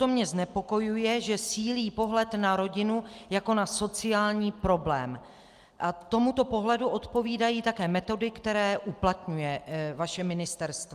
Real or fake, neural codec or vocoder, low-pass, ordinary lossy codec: real; none; 14.4 kHz; Opus, 32 kbps